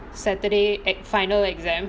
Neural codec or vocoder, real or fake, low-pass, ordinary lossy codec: none; real; none; none